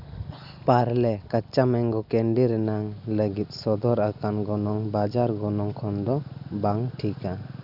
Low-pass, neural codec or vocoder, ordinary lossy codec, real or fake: 5.4 kHz; none; none; real